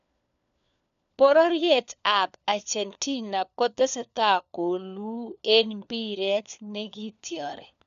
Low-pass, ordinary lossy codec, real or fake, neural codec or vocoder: 7.2 kHz; none; fake; codec, 16 kHz, 4 kbps, FunCodec, trained on LibriTTS, 50 frames a second